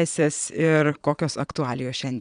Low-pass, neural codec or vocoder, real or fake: 9.9 kHz; vocoder, 22.05 kHz, 80 mel bands, Vocos; fake